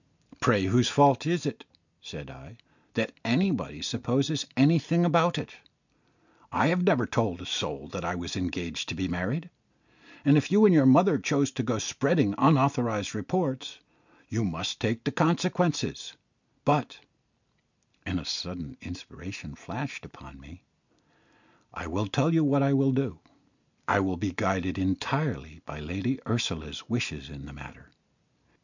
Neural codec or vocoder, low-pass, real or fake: none; 7.2 kHz; real